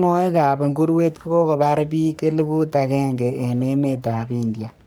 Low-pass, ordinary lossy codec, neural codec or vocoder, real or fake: none; none; codec, 44.1 kHz, 3.4 kbps, Pupu-Codec; fake